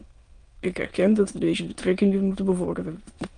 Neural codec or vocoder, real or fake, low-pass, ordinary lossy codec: autoencoder, 22.05 kHz, a latent of 192 numbers a frame, VITS, trained on many speakers; fake; 9.9 kHz; Opus, 32 kbps